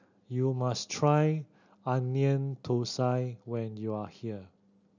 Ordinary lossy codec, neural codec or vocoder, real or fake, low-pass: none; none; real; 7.2 kHz